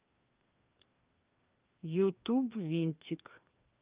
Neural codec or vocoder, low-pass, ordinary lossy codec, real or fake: codec, 16 kHz, 2 kbps, FreqCodec, larger model; 3.6 kHz; Opus, 32 kbps; fake